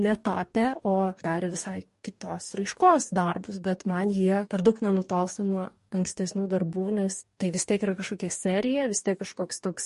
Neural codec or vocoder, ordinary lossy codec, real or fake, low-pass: codec, 44.1 kHz, 2.6 kbps, DAC; MP3, 48 kbps; fake; 14.4 kHz